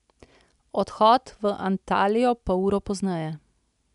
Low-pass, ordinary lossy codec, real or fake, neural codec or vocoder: 10.8 kHz; none; real; none